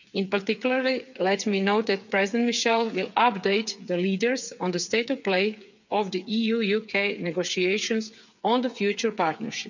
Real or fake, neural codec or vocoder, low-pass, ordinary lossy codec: fake; codec, 16 kHz, 8 kbps, FreqCodec, smaller model; 7.2 kHz; none